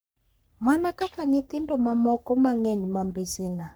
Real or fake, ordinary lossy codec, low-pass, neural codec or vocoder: fake; none; none; codec, 44.1 kHz, 3.4 kbps, Pupu-Codec